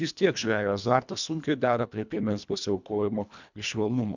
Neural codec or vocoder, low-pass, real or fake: codec, 24 kHz, 1.5 kbps, HILCodec; 7.2 kHz; fake